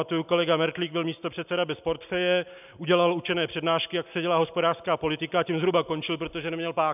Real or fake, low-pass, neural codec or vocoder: real; 3.6 kHz; none